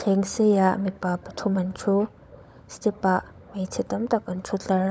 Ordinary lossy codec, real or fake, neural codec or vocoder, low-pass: none; fake; codec, 16 kHz, 16 kbps, FunCodec, trained on LibriTTS, 50 frames a second; none